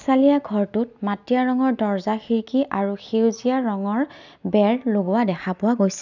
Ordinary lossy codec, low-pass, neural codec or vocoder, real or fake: none; 7.2 kHz; none; real